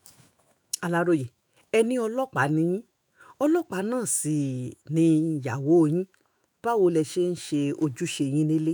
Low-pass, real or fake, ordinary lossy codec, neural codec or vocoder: none; fake; none; autoencoder, 48 kHz, 128 numbers a frame, DAC-VAE, trained on Japanese speech